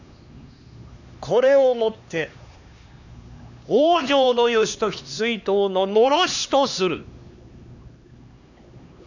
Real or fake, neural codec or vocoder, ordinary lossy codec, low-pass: fake; codec, 16 kHz, 2 kbps, X-Codec, HuBERT features, trained on LibriSpeech; none; 7.2 kHz